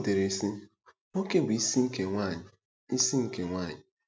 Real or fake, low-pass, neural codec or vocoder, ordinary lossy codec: real; none; none; none